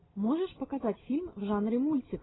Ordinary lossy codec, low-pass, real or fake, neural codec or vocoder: AAC, 16 kbps; 7.2 kHz; real; none